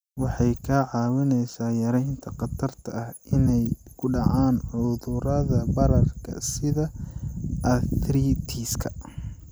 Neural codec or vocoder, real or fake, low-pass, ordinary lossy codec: none; real; none; none